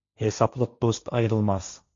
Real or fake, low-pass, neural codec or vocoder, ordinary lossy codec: fake; 7.2 kHz; codec, 16 kHz, 1.1 kbps, Voila-Tokenizer; Opus, 64 kbps